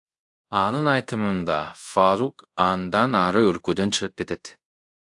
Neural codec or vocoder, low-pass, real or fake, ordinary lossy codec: codec, 24 kHz, 0.5 kbps, DualCodec; 10.8 kHz; fake; AAC, 64 kbps